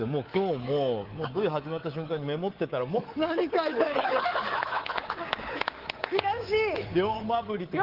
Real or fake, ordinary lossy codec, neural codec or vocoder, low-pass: fake; Opus, 16 kbps; codec, 24 kHz, 3.1 kbps, DualCodec; 5.4 kHz